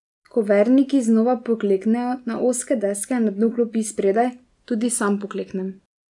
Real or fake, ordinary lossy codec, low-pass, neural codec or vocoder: real; none; 10.8 kHz; none